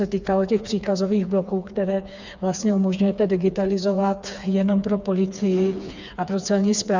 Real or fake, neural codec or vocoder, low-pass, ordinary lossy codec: fake; codec, 16 kHz, 4 kbps, FreqCodec, smaller model; 7.2 kHz; Opus, 64 kbps